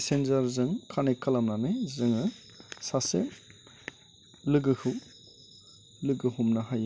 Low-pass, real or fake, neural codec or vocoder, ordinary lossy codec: none; real; none; none